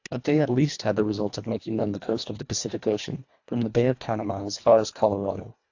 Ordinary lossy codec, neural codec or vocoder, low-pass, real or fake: AAC, 48 kbps; codec, 24 kHz, 1.5 kbps, HILCodec; 7.2 kHz; fake